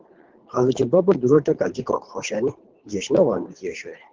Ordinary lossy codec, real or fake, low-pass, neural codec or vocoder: Opus, 16 kbps; fake; 7.2 kHz; codec, 24 kHz, 3 kbps, HILCodec